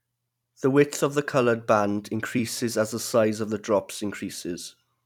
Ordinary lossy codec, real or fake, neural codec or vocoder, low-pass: none; fake; vocoder, 44.1 kHz, 128 mel bands every 256 samples, BigVGAN v2; 19.8 kHz